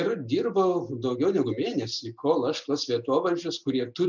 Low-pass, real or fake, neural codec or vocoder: 7.2 kHz; real; none